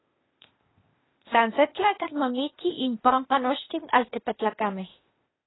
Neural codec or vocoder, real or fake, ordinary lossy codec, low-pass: codec, 16 kHz, 0.8 kbps, ZipCodec; fake; AAC, 16 kbps; 7.2 kHz